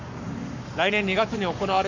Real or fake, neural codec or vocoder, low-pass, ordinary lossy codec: fake; codec, 44.1 kHz, 7.8 kbps, Pupu-Codec; 7.2 kHz; none